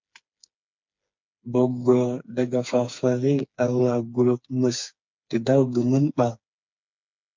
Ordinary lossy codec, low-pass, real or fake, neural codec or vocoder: MP3, 64 kbps; 7.2 kHz; fake; codec, 16 kHz, 4 kbps, FreqCodec, smaller model